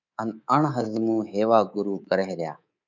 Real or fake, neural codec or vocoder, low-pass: fake; codec, 24 kHz, 3.1 kbps, DualCodec; 7.2 kHz